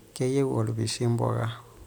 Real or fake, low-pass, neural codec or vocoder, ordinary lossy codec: real; none; none; none